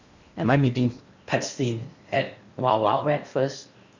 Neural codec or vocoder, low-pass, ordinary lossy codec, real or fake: codec, 16 kHz in and 24 kHz out, 0.8 kbps, FocalCodec, streaming, 65536 codes; 7.2 kHz; Opus, 64 kbps; fake